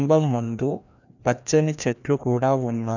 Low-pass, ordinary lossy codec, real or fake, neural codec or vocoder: 7.2 kHz; none; fake; codec, 16 kHz, 1 kbps, FreqCodec, larger model